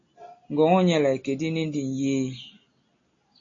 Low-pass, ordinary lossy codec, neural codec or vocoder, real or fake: 7.2 kHz; AAC, 32 kbps; none; real